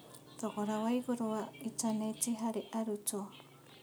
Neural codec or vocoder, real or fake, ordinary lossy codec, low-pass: none; real; none; none